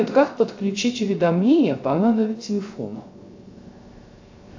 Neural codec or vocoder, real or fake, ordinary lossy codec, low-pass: codec, 16 kHz, 0.3 kbps, FocalCodec; fake; AAC, 48 kbps; 7.2 kHz